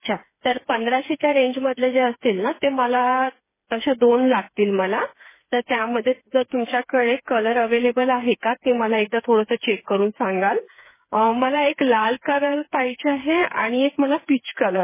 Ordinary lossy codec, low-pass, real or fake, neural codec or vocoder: MP3, 16 kbps; 3.6 kHz; fake; codec, 16 kHz, 4 kbps, FreqCodec, smaller model